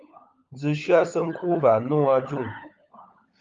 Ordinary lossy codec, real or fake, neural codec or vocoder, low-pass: Opus, 32 kbps; fake; codec, 16 kHz, 16 kbps, FunCodec, trained on LibriTTS, 50 frames a second; 7.2 kHz